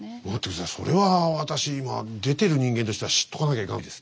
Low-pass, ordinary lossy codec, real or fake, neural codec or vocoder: none; none; real; none